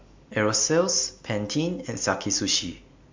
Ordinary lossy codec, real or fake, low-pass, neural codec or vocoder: MP3, 64 kbps; real; 7.2 kHz; none